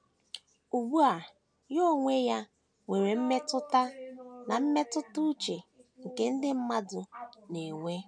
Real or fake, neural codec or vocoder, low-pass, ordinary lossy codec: real; none; 9.9 kHz; none